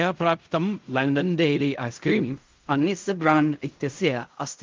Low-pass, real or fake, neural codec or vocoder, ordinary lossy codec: 7.2 kHz; fake; codec, 16 kHz in and 24 kHz out, 0.4 kbps, LongCat-Audio-Codec, fine tuned four codebook decoder; Opus, 24 kbps